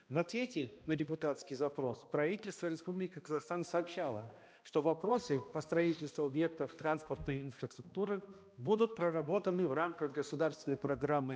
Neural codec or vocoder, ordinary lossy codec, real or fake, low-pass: codec, 16 kHz, 1 kbps, X-Codec, HuBERT features, trained on balanced general audio; none; fake; none